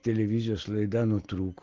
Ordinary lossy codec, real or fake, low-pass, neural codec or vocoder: Opus, 16 kbps; real; 7.2 kHz; none